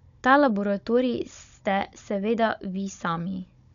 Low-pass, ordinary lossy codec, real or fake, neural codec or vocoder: 7.2 kHz; none; fake; codec, 16 kHz, 16 kbps, FunCodec, trained on Chinese and English, 50 frames a second